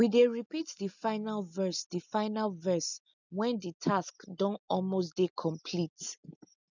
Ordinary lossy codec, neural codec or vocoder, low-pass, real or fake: none; none; 7.2 kHz; real